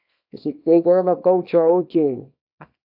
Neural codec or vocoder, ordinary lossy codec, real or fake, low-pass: codec, 24 kHz, 0.9 kbps, WavTokenizer, small release; AAC, 48 kbps; fake; 5.4 kHz